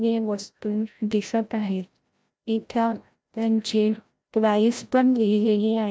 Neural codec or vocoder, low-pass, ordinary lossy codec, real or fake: codec, 16 kHz, 0.5 kbps, FreqCodec, larger model; none; none; fake